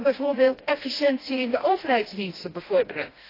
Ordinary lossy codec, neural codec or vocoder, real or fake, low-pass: AAC, 24 kbps; codec, 16 kHz, 1 kbps, FreqCodec, smaller model; fake; 5.4 kHz